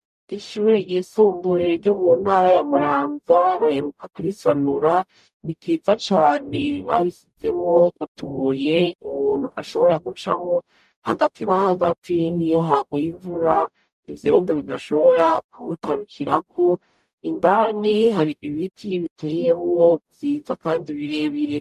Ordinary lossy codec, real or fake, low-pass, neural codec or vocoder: MP3, 96 kbps; fake; 14.4 kHz; codec, 44.1 kHz, 0.9 kbps, DAC